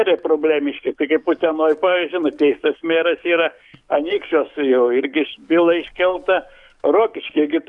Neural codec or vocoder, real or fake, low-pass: codec, 44.1 kHz, 7.8 kbps, DAC; fake; 10.8 kHz